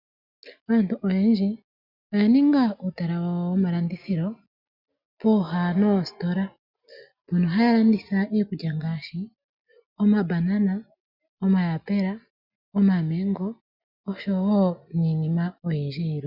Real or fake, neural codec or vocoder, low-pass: real; none; 5.4 kHz